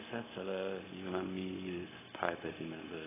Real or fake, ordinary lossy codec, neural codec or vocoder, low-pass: fake; AAC, 16 kbps; codec, 16 kHz, 0.4 kbps, LongCat-Audio-Codec; 3.6 kHz